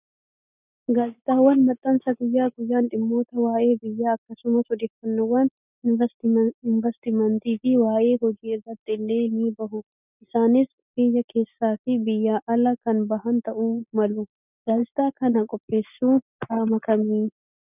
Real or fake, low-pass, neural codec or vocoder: real; 3.6 kHz; none